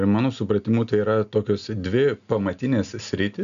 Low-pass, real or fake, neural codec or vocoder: 7.2 kHz; real; none